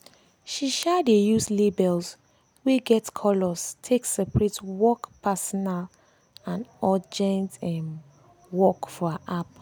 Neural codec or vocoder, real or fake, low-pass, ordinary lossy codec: none; real; none; none